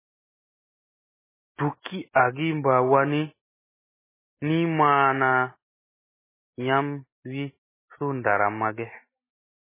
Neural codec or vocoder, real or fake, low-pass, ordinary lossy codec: none; real; 3.6 kHz; MP3, 16 kbps